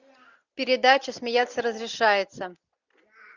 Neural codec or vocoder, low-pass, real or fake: none; 7.2 kHz; real